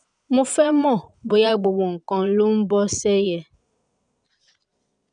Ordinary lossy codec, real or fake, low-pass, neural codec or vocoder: none; fake; 9.9 kHz; vocoder, 22.05 kHz, 80 mel bands, Vocos